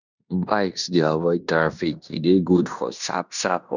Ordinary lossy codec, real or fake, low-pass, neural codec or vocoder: none; fake; 7.2 kHz; codec, 16 kHz in and 24 kHz out, 0.9 kbps, LongCat-Audio-Codec, fine tuned four codebook decoder